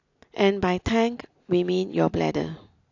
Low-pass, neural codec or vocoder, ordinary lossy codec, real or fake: 7.2 kHz; none; AAC, 48 kbps; real